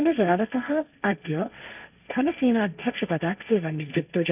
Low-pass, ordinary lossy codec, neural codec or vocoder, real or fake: 3.6 kHz; none; codec, 16 kHz, 1.1 kbps, Voila-Tokenizer; fake